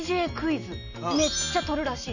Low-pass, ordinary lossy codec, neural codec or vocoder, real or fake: 7.2 kHz; none; none; real